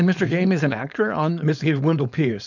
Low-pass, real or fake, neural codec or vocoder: 7.2 kHz; fake; codec, 16 kHz, 4.8 kbps, FACodec